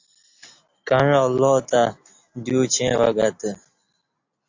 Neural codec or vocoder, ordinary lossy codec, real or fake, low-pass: none; AAC, 48 kbps; real; 7.2 kHz